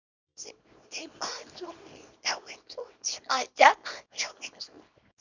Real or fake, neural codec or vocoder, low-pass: fake; codec, 24 kHz, 0.9 kbps, WavTokenizer, small release; 7.2 kHz